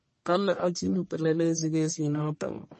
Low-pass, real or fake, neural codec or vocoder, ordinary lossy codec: 10.8 kHz; fake; codec, 44.1 kHz, 1.7 kbps, Pupu-Codec; MP3, 32 kbps